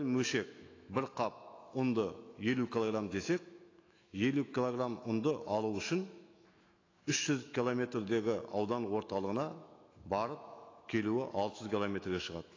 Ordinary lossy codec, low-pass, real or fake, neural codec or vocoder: AAC, 32 kbps; 7.2 kHz; fake; autoencoder, 48 kHz, 128 numbers a frame, DAC-VAE, trained on Japanese speech